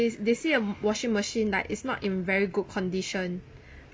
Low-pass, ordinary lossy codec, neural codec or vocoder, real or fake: none; none; none; real